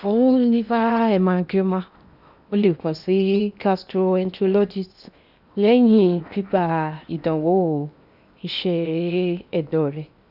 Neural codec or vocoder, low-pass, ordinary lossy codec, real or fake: codec, 16 kHz in and 24 kHz out, 0.8 kbps, FocalCodec, streaming, 65536 codes; 5.4 kHz; none; fake